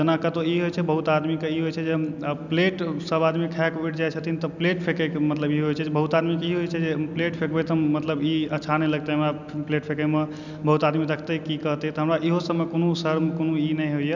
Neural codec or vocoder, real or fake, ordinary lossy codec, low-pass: none; real; none; 7.2 kHz